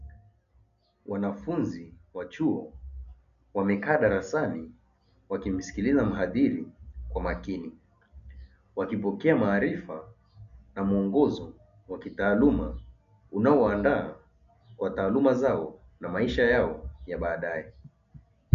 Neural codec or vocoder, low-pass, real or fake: none; 7.2 kHz; real